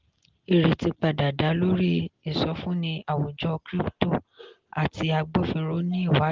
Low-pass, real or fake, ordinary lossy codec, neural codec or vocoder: 7.2 kHz; real; Opus, 16 kbps; none